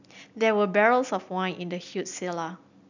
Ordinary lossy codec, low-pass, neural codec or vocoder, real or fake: none; 7.2 kHz; none; real